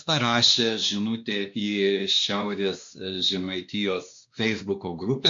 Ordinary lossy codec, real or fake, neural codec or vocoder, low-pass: MP3, 48 kbps; fake; codec, 16 kHz, 2 kbps, X-Codec, WavLM features, trained on Multilingual LibriSpeech; 7.2 kHz